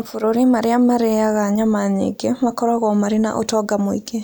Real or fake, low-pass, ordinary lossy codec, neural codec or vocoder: real; none; none; none